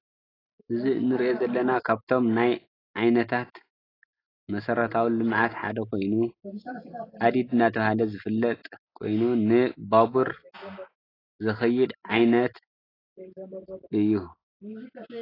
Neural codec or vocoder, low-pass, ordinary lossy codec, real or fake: none; 5.4 kHz; AAC, 24 kbps; real